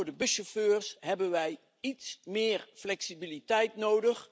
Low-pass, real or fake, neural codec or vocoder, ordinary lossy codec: none; real; none; none